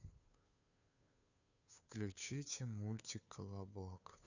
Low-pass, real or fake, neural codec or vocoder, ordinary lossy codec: 7.2 kHz; fake; codec, 16 kHz, 2 kbps, FunCodec, trained on Chinese and English, 25 frames a second; none